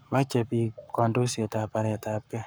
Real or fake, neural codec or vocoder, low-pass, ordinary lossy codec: fake; codec, 44.1 kHz, 7.8 kbps, Pupu-Codec; none; none